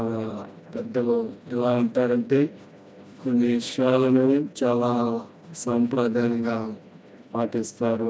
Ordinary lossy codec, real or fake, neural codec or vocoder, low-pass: none; fake; codec, 16 kHz, 1 kbps, FreqCodec, smaller model; none